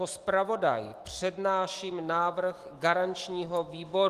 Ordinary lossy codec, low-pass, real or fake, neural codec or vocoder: Opus, 24 kbps; 14.4 kHz; fake; autoencoder, 48 kHz, 128 numbers a frame, DAC-VAE, trained on Japanese speech